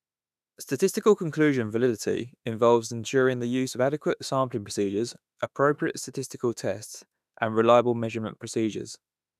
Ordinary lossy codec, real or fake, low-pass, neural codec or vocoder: none; fake; 14.4 kHz; autoencoder, 48 kHz, 32 numbers a frame, DAC-VAE, trained on Japanese speech